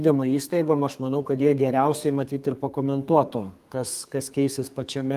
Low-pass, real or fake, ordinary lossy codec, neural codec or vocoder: 14.4 kHz; fake; Opus, 32 kbps; codec, 32 kHz, 1.9 kbps, SNAC